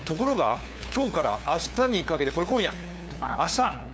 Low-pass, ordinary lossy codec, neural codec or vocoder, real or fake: none; none; codec, 16 kHz, 2 kbps, FunCodec, trained on LibriTTS, 25 frames a second; fake